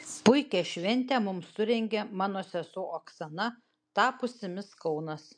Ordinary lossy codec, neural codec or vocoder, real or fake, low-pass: MP3, 64 kbps; none; real; 9.9 kHz